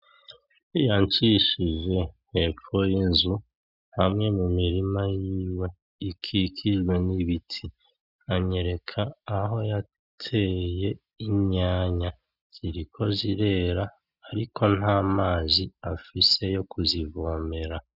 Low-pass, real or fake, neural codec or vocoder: 5.4 kHz; real; none